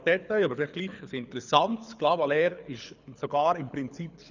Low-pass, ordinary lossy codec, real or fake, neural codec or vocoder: 7.2 kHz; none; fake; codec, 24 kHz, 6 kbps, HILCodec